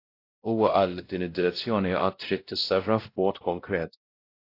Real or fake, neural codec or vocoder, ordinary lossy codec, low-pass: fake; codec, 16 kHz, 0.5 kbps, X-Codec, WavLM features, trained on Multilingual LibriSpeech; AAC, 32 kbps; 5.4 kHz